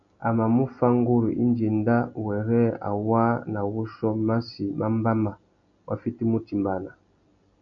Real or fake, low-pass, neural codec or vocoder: real; 7.2 kHz; none